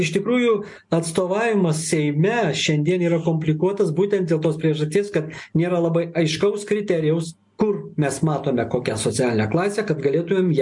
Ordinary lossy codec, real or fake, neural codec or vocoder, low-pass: MP3, 64 kbps; real; none; 10.8 kHz